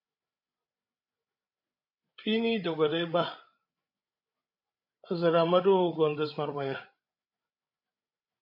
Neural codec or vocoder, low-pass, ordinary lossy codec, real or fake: codec, 16 kHz, 16 kbps, FreqCodec, larger model; 5.4 kHz; MP3, 32 kbps; fake